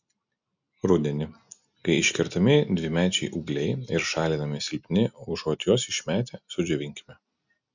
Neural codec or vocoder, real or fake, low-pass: none; real; 7.2 kHz